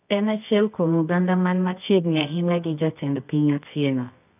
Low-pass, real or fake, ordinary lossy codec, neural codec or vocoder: 3.6 kHz; fake; none; codec, 24 kHz, 0.9 kbps, WavTokenizer, medium music audio release